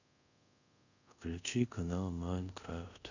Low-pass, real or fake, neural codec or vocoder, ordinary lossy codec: 7.2 kHz; fake; codec, 24 kHz, 0.5 kbps, DualCodec; none